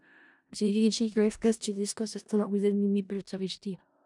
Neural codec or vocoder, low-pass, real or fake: codec, 16 kHz in and 24 kHz out, 0.4 kbps, LongCat-Audio-Codec, four codebook decoder; 10.8 kHz; fake